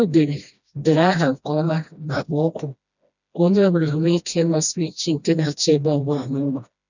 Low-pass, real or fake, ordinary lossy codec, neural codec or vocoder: 7.2 kHz; fake; none; codec, 16 kHz, 1 kbps, FreqCodec, smaller model